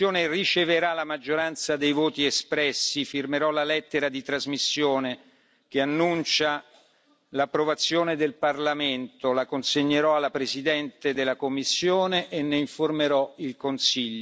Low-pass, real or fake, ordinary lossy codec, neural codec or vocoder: none; real; none; none